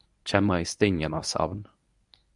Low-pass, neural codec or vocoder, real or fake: 10.8 kHz; codec, 24 kHz, 0.9 kbps, WavTokenizer, medium speech release version 1; fake